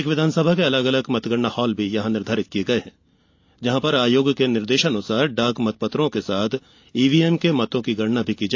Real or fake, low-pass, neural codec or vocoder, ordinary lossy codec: real; 7.2 kHz; none; AAC, 48 kbps